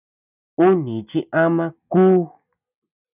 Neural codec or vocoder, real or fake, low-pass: none; real; 3.6 kHz